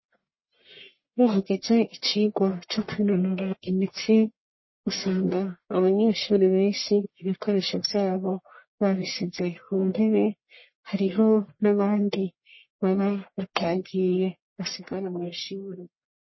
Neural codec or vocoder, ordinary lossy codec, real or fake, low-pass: codec, 44.1 kHz, 1.7 kbps, Pupu-Codec; MP3, 24 kbps; fake; 7.2 kHz